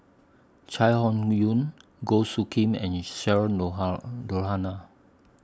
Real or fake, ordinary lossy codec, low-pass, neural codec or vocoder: real; none; none; none